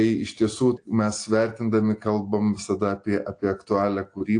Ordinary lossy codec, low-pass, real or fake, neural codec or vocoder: AAC, 48 kbps; 9.9 kHz; real; none